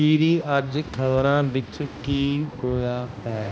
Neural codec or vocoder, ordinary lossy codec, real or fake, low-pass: codec, 16 kHz, 1 kbps, X-Codec, HuBERT features, trained on balanced general audio; none; fake; none